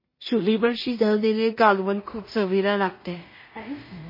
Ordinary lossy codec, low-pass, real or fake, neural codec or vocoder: MP3, 24 kbps; 5.4 kHz; fake; codec, 16 kHz in and 24 kHz out, 0.4 kbps, LongCat-Audio-Codec, two codebook decoder